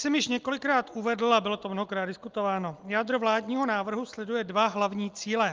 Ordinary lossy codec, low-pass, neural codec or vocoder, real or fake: Opus, 24 kbps; 7.2 kHz; none; real